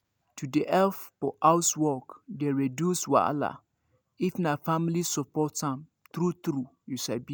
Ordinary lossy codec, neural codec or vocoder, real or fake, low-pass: none; none; real; none